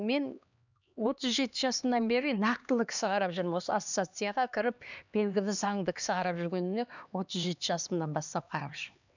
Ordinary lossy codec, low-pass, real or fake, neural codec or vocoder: none; 7.2 kHz; fake; codec, 16 kHz, 2 kbps, X-Codec, HuBERT features, trained on LibriSpeech